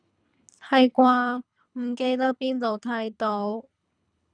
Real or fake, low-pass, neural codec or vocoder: fake; 9.9 kHz; codec, 24 kHz, 3 kbps, HILCodec